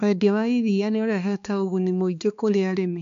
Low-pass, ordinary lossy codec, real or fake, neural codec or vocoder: 7.2 kHz; none; fake; codec, 16 kHz, 2 kbps, X-Codec, HuBERT features, trained on balanced general audio